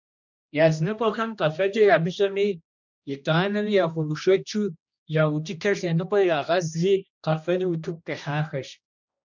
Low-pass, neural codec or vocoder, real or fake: 7.2 kHz; codec, 16 kHz, 1 kbps, X-Codec, HuBERT features, trained on general audio; fake